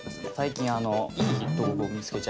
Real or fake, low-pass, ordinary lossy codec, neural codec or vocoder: real; none; none; none